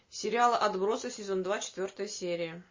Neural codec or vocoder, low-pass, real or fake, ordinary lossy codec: none; 7.2 kHz; real; MP3, 32 kbps